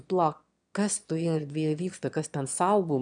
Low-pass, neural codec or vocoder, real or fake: 9.9 kHz; autoencoder, 22.05 kHz, a latent of 192 numbers a frame, VITS, trained on one speaker; fake